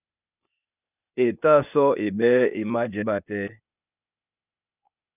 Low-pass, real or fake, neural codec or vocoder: 3.6 kHz; fake; codec, 16 kHz, 0.8 kbps, ZipCodec